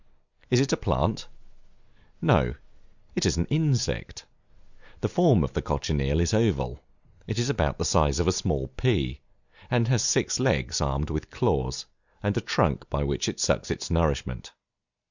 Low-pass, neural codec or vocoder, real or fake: 7.2 kHz; none; real